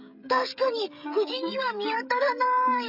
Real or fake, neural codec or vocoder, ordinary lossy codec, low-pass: fake; codec, 16 kHz, 16 kbps, FreqCodec, smaller model; AAC, 48 kbps; 5.4 kHz